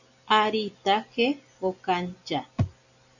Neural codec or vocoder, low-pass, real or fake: none; 7.2 kHz; real